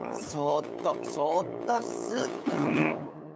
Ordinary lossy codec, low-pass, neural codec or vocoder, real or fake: none; none; codec, 16 kHz, 8 kbps, FunCodec, trained on LibriTTS, 25 frames a second; fake